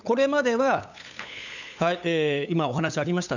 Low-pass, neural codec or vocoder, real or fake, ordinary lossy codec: 7.2 kHz; codec, 16 kHz, 8 kbps, FunCodec, trained on LibriTTS, 25 frames a second; fake; none